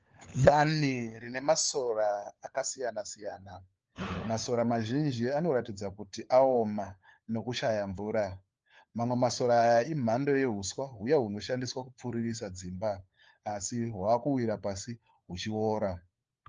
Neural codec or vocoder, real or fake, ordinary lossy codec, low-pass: codec, 16 kHz, 4 kbps, FunCodec, trained on LibriTTS, 50 frames a second; fake; Opus, 24 kbps; 7.2 kHz